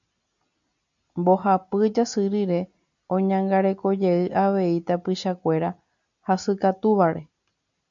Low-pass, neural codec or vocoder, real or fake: 7.2 kHz; none; real